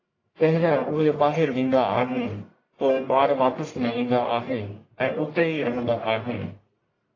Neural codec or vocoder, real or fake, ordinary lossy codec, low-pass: codec, 44.1 kHz, 1.7 kbps, Pupu-Codec; fake; AAC, 32 kbps; 7.2 kHz